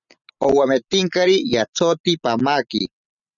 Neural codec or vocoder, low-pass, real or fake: none; 7.2 kHz; real